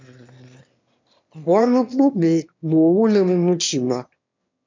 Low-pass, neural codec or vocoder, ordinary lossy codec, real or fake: 7.2 kHz; autoencoder, 22.05 kHz, a latent of 192 numbers a frame, VITS, trained on one speaker; MP3, 64 kbps; fake